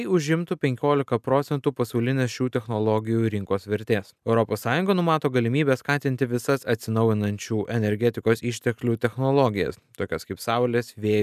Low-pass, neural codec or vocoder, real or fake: 14.4 kHz; none; real